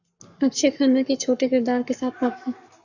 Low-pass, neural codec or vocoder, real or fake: 7.2 kHz; codec, 44.1 kHz, 7.8 kbps, Pupu-Codec; fake